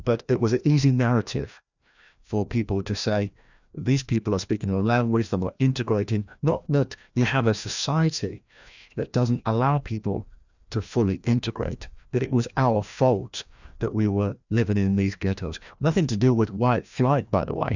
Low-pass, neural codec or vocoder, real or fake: 7.2 kHz; codec, 16 kHz, 1 kbps, FreqCodec, larger model; fake